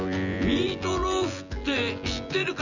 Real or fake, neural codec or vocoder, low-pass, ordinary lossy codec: fake; vocoder, 24 kHz, 100 mel bands, Vocos; 7.2 kHz; none